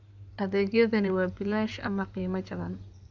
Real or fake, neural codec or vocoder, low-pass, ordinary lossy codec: fake; codec, 16 kHz in and 24 kHz out, 2.2 kbps, FireRedTTS-2 codec; 7.2 kHz; none